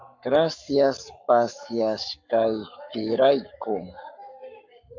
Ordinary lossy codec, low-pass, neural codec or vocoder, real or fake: MP3, 64 kbps; 7.2 kHz; codec, 44.1 kHz, 7.8 kbps, Pupu-Codec; fake